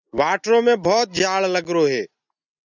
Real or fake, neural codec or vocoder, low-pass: real; none; 7.2 kHz